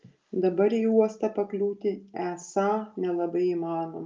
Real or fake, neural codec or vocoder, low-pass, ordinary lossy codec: real; none; 7.2 kHz; Opus, 24 kbps